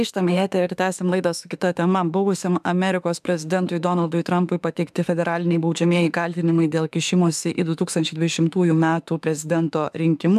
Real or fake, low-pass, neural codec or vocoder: fake; 14.4 kHz; autoencoder, 48 kHz, 32 numbers a frame, DAC-VAE, trained on Japanese speech